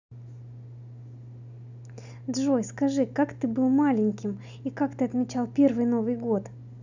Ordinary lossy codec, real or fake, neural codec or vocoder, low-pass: none; real; none; 7.2 kHz